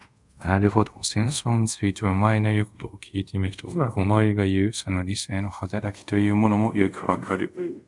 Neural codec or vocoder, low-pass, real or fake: codec, 24 kHz, 0.5 kbps, DualCodec; 10.8 kHz; fake